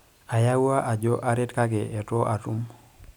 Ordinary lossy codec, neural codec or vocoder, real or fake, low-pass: none; none; real; none